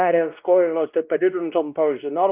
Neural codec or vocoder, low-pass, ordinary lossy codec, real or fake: codec, 16 kHz, 1 kbps, X-Codec, WavLM features, trained on Multilingual LibriSpeech; 3.6 kHz; Opus, 32 kbps; fake